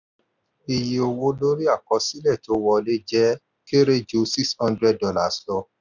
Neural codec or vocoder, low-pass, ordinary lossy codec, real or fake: none; 7.2 kHz; none; real